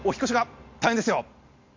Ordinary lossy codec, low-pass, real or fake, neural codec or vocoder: MP3, 48 kbps; 7.2 kHz; real; none